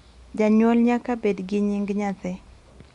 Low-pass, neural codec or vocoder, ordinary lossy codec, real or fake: 10.8 kHz; none; Opus, 64 kbps; real